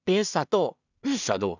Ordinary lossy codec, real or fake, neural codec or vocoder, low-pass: none; fake; codec, 16 kHz in and 24 kHz out, 0.4 kbps, LongCat-Audio-Codec, two codebook decoder; 7.2 kHz